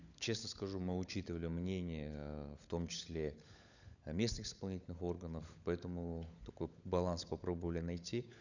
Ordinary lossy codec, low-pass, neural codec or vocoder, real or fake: none; 7.2 kHz; codec, 16 kHz, 16 kbps, FunCodec, trained on LibriTTS, 50 frames a second; fake